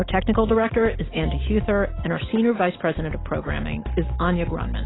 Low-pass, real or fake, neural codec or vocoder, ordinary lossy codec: 7.2 kHz; real; none; AAC, 16 kbps